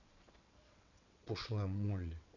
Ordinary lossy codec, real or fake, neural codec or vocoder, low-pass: none; fake; vocoder, 22.05 kHz, 80 mel bands, WaveNeXt; 7.2 kHz